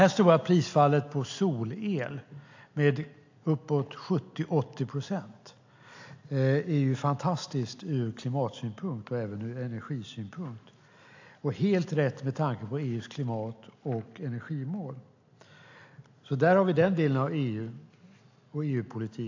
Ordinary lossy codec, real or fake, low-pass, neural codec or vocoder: AAC, 48 kbps; real; 7.2 kHz; none